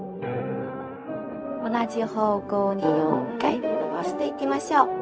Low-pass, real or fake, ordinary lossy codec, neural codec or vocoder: none; fake; none; codec, 16 kHz, 0.4 kbps, LongCat-Audio-Codec